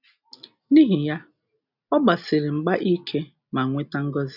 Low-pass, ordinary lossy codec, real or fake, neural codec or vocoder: 5.4 kHz; none; real; none